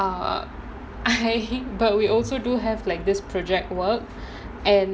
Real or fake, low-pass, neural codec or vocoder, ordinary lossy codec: real; none; none; none